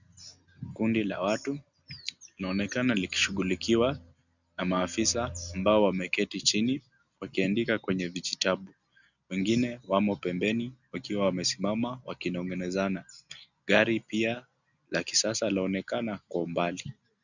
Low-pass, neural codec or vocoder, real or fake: 7.2 kHz; none; real